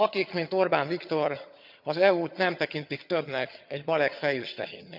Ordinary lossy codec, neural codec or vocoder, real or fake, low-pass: none; vocoder, 22.05 kHz, 80 mel bands, HiFi-GAN; fake; 5.4 kHz